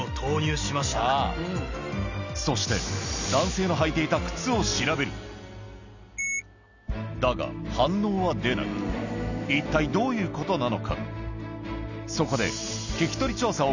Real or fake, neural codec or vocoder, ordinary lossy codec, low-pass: real; none; none; 7.2 kHz